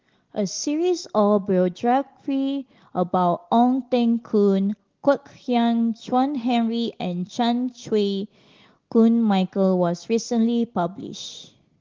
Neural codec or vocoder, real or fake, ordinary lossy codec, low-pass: none; real; Opus, 16 kbps; 7.2 kHz